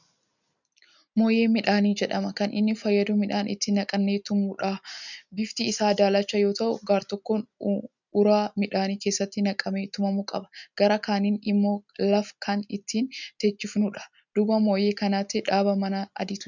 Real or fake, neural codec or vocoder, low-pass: real; none; 7.2 kHz